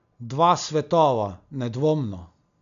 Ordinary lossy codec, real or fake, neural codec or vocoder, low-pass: none; real; none; 7.2 kHz